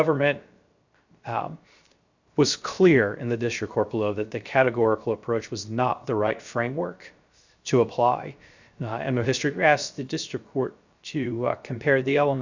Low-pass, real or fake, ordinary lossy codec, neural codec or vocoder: 7.2 kHz; fake; Opus, 64 kbps; codec, 16 kHz, 0.3 kbps, FocalCodec